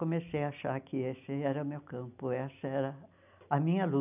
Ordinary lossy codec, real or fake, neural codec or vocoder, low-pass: none; real; none; 3.6 kHz